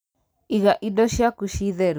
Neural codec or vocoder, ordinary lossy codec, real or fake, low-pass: none; none; real; none